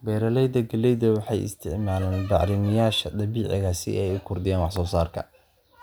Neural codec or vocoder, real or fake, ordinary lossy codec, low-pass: none; real; none; none